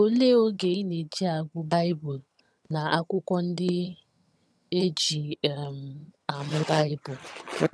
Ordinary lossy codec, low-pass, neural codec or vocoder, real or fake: none; none; vocoder, 22.05 kHz, 80 mel bands, HiFi-GAN; fake